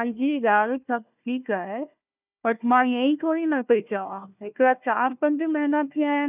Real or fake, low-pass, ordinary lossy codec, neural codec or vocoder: fake; 3.6 kHz; none; codec, 16 kHz, 1 kbps, FunCodec, trained on Chinese and English, 50 frames a second